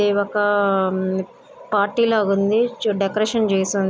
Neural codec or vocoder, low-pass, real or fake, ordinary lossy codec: none; none; real; none